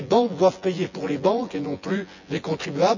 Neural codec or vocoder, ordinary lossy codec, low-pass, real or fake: vocoder, 24 kHz, 100 mel bands, Vocos; none; 7.2 kHz; fake